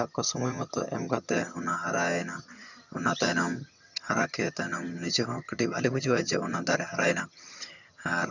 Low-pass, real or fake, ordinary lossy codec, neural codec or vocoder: 7.2 kHz; fake; none; vocoder, 22.05 kHz, 80 mel bands, HiFi-GAN